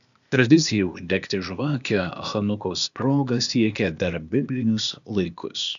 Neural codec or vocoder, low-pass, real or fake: codec, 16 kHz, 0.8 kbps, ZipCodec; 7.2 kHz; fake